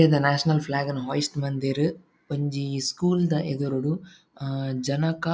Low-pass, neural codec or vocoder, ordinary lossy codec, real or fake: none; none; none; real